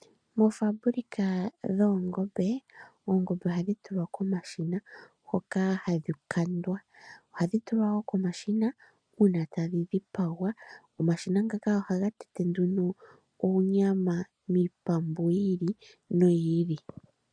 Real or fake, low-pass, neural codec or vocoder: real; 9.9 kHz; none